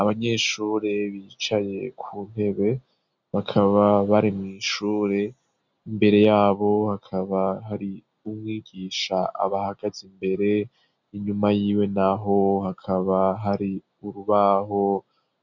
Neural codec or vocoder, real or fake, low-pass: none; real; 7.2 kHz